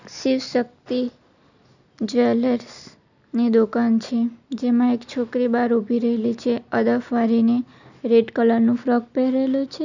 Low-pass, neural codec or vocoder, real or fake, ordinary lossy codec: 7.2 kHz; none; real; none